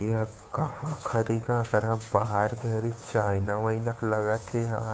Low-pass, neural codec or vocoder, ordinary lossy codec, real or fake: none; codec, 16 kHz, 2 kbps, FunCodec, trained on Chinese and English, 25 frames a second; none; fake